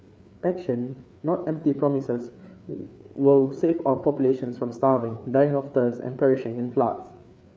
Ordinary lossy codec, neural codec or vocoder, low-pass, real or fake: none; codec, 16 kHz, 4 kbps, FreqCodec, larger model; none; fake